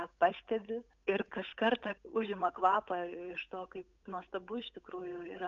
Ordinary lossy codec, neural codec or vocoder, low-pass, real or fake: MP3, 96 kbps; codec, 16 kHz, 8 kbps, FunCodec, trained on Chinese and English, 25 frames a second; 7.2 kHz; fake